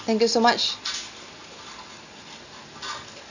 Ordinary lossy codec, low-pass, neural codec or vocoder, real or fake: none; 7.2 kHz; none; real